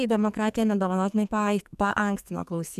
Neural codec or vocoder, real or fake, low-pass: codec, 44.1 kHz, 2.6 kbps, SNAC; fake; 14.4 kHz